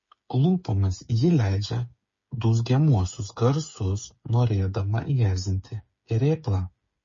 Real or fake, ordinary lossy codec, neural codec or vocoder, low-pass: fake; MP3, 32 kbps; codec, 16 kHz, 8 kbps, FreqCodec, smaller model; 7.2 kHz